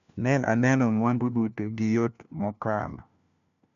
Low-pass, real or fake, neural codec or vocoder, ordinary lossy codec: 7.2 kHz; fake; codec, 16 kHz, 1 kbps, FunCodec, trained on LibriTTS, 50 frames a second; none